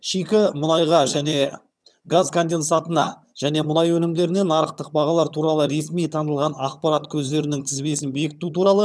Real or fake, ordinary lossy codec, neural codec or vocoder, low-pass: fake; none; vocoder, 22.05 kHz, 80 mel bands, HiFi-GAN; none